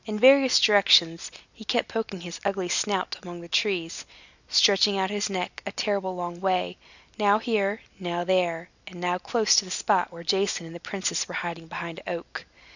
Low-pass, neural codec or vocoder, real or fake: 7.2 kHz; none; real